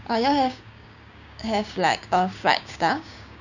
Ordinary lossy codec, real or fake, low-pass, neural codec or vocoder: none; fake; 7.2 kHz; codec, 16 kHz, 6 kbps, DAC